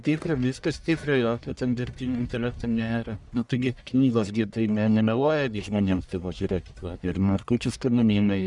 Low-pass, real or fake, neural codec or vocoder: 10.8 kHz; fake; codec, 44.1 kHz, 1.7 kbps, Pupu-Codec